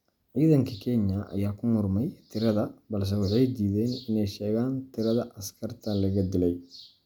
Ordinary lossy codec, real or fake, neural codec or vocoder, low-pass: none; real; none; 19.8 kHz